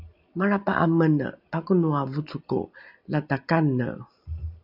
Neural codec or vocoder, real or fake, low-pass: none; real; 5.4 kHz